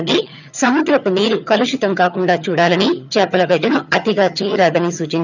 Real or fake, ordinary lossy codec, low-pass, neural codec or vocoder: fake; none; 7.2 kHz; vocoder, 22.05 kHz, 80 mel bands, HiFi-GAN